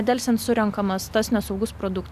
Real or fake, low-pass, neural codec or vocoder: real; 14.4 kHz; none